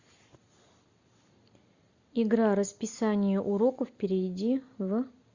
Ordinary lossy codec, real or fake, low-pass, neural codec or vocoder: Opus, 64 kbps; real; 7.2 kHz; none